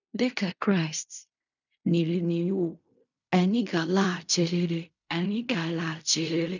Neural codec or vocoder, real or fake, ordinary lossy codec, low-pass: codec, 16 kHz in and 24 kHz out, 0.4 kbps, LongCat-Audio-Codec, fine tuned four codebook decoder; fake; none; 7.2 kHz